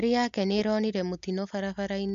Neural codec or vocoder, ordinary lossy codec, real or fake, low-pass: none; AAC, 64 kbps; real; 7.2 kHz